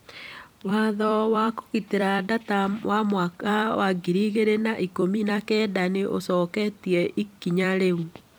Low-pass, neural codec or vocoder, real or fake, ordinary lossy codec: none; vocoder, 44.1 kHz, 128 mel bands every 256 samples, BigVGAN v2; fake; none